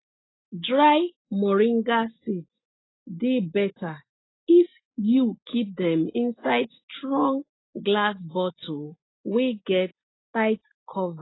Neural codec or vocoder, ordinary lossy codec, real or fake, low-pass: none; AAC, 16 kbps; real; 7.2 kHz